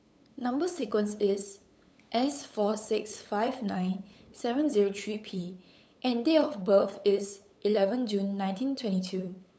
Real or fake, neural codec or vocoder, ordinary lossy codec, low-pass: fake; codec, 16 kHz, 8 kbps, FunCodec, trained on LibriTTS, 25 frames a second; none; none